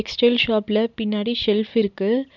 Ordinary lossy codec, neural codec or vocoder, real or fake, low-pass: none; none; real; 7.2 kHz